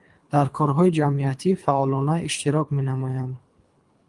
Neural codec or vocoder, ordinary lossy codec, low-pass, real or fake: codec, 24 kHz, 3 kbps, HILCodec; Opus, 32 kbps; 10.8 kHz; fake